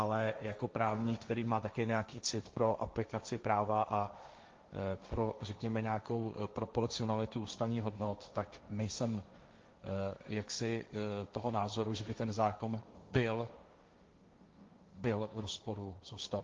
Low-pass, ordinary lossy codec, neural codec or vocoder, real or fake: 7.2 kHz; Opus, 32 kbps; codec, 16 kHz, 1.1 kbps, Voila-Tokenizer; fake